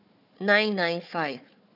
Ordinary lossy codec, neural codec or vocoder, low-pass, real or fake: none; codec, 16 kHz, 4 kbps, FunCodec, trained on Chinese and English, 50 frames a second; 5.4 kHz; fake